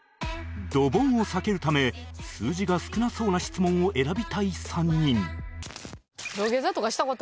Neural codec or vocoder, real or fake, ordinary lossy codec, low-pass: none; real; none; none